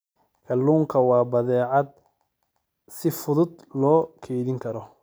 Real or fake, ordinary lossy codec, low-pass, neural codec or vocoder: real; none; none; none